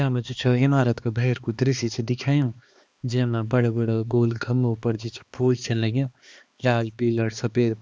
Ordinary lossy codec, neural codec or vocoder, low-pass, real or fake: none; codec, 16 kHz, 2 kbps, X-Codec, HuBERT features, trained on balanced general audio; none; fake